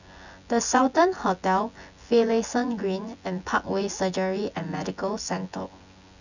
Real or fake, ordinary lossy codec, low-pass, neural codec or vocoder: fake; none; 7.2 kHz; vocoder, 24 kHz, 100 mel bands, Vocos